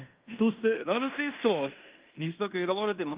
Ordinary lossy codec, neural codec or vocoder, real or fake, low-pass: Opus, 24 kbps; codec, 16 kHz in and 24 kHz out, 0.9 kbps, LongCat-Audio-Codec, fine tuned four codebook decoder; fake; 3.6 kHz